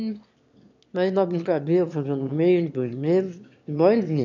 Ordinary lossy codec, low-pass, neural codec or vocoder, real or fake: none; 7.2 kHz; autoencoder, 22.05 kHz, a latent of 192 numbers a frame, VITS, trained on one speaker; fake